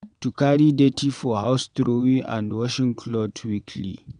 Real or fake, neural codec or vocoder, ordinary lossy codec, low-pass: fake; vocoder, 22.05 kHz, 80 mel bands, WaveNeXt; none; 9.9 kHz